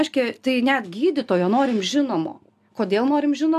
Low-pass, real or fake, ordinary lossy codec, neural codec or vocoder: 14.4 kHz; real; AAC, 96 kbps; none